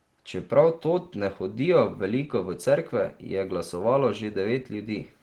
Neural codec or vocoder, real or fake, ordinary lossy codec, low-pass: none; real; Opus, 16 kbps; 19.8 kHz